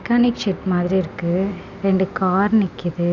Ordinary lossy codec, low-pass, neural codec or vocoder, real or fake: none; 7.2 kHz; none; real